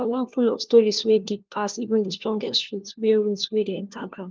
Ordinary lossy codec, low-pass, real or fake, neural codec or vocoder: Opus, 32 kbps; 7.2 kHz; fake; codec, 16 kHz, 1 kbps, FunCodec, trained on LibriTTS, 50 frames a second